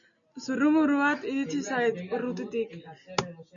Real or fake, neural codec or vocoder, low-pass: real; none; 7.2 kHz